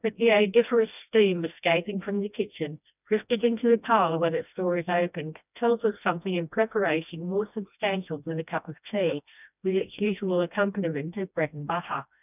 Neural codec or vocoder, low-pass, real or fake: codec, 16 kHz, 1 kbps, FreqCodec, smaller model; 3.6 kHz; fake